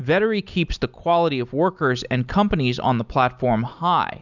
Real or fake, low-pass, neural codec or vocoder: real; 7.2 kHz; none